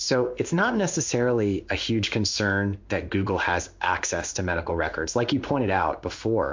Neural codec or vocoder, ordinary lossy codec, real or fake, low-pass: codec, 16 kHz in and 24 kHz out, 1 kbps, XY-Tokenizer; MP3, 48 kbps; fake; 7.2 kHz